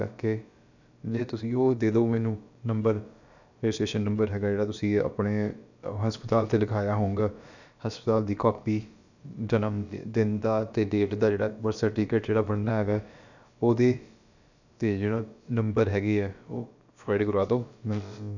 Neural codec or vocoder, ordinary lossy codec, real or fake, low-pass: codec, 16 kHz, about 1 kbps, DyCAST, with the encoder's durations; none; fake; 7.2 kHz